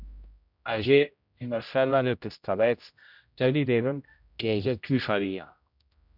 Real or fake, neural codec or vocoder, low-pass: fake; codec, 16 kHz, 0.5 kbps, X-Codec, HuBERT features, trained on general audio; 5.4 kHz